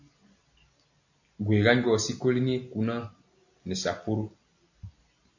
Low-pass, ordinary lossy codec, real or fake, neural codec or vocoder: 7.2 kHz; AAC, 48 kbps; real; none